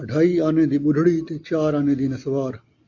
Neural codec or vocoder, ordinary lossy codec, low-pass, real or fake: none; AAC, 48 kbps; 7.2 kHz; real